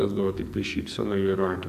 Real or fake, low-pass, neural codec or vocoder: fake; 14.4 kHz; codec, 44.1 kHz, 2.6 kbps, SNAC